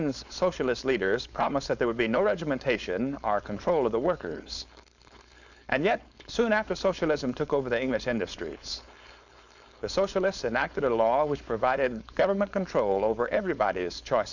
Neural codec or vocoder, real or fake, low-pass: codec, 16 kHz, 4.8 kbps, FACodec; fake; 7.2 kHz